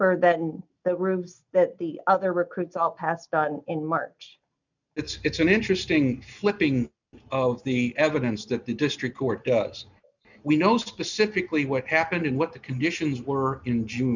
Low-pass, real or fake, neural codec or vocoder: 7.2 kHz; real; none